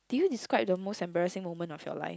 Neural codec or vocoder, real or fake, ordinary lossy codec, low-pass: none; real; none; none